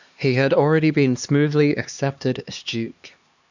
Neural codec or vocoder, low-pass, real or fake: codec, 16 kHz, 2 kbps, X-Codec, HuBERT features, trained on LibriSpeech; 7.2 kHz; fake